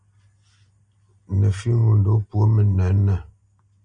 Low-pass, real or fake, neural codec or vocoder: 9.9 kHz; real; none